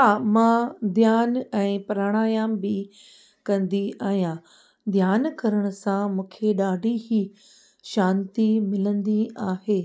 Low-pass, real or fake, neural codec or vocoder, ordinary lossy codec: none; real; none; none